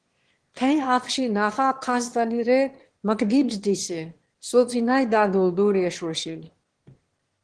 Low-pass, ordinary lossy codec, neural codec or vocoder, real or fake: 9.9 kHz; Opus, 16 kbps; autoencoder, 22.05 kHz, a latent of 192 numbers a frame, VITS, trained on one speaker; fake